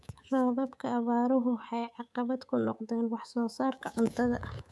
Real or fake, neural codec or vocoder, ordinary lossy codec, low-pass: fake; codec, 24 kHz, 3.1 kbps, DualCodec; none; none